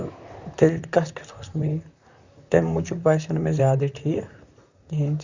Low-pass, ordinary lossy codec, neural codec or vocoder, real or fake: 7.2 kHz; Opus, 64 kbps; vocoder, 44.1 kHz, 128 mel bands, Pupu-Vocoder; fake